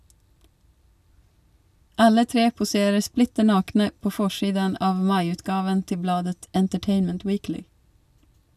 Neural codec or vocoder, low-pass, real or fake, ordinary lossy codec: none; 14.4 kHz; real; none